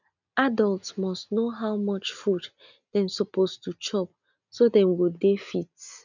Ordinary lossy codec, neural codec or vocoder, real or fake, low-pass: none; none; real; 7.2 kHz